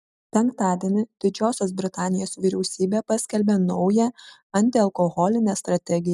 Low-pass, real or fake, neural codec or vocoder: 14.4 kHz; real; none